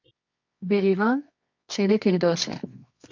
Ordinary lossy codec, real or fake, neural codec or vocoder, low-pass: MP3, 64 kbps; fake; codec, 24 kHz, 0.9 kbps, WavTokenizer, medium music audio release; 7.2 kHz